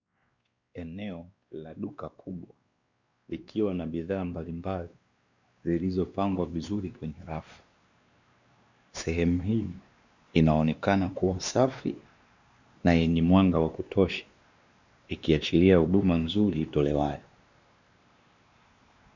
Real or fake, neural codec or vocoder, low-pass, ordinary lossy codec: fake; codec, 16 kHz, 2 kbps, X-Codec, WavLM features, trained on Multilingual LibriSpeech; 7.2 kHz; Opus, 64 kbps